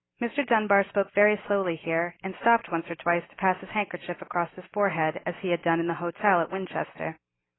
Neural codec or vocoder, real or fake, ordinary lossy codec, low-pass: none; real; AAC, 16 kbps; 7.2 kHz